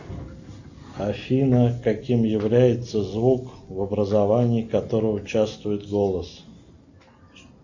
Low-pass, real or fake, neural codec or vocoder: 7.2 kHz; real; none